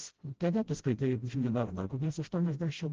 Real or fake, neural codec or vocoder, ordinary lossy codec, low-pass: fake; codec, 16 kHz, 0.5 kbps, FreqCodec, smaller model; Opus, 16 kbps; 7.2 kHz